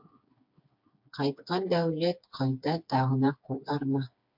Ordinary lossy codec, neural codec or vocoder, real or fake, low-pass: MP3, 48 kbps; codec, 16 kHz, 4 kbps, FreqCodec, smaller model; fake; 5.4 kHz